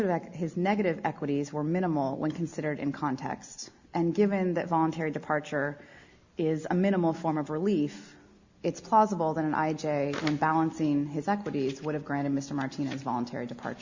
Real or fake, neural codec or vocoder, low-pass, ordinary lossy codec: real; none; 7.2 kHz; Opus, 64 kbps